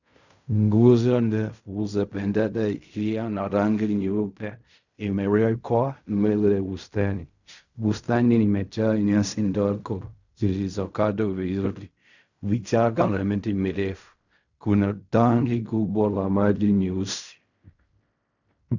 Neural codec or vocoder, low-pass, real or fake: codec, 16 kHz in and 24 kHz out, 0.4 kbps, LongCat-Audio-Codec, fine tuned four codebook decoder; 7.2 kHz; fake